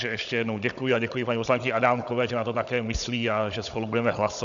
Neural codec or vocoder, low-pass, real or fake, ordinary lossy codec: codec, 16 kHz, 16 kbps, FunCodec, trained on Chinese and English, 50 frames a second; 7.2 kHz; fake; MP3, 96 kbps